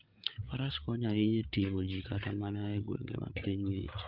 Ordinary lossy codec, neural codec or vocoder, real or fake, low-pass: Opus, 24 kbps; codec, 16 kHz, 8 kbps, FreqCodec, larger model; fake; 5.4 kHz